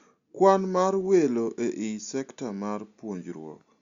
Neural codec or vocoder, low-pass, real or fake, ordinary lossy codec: none; 7.2 kHz; real; Opus, 64 kbps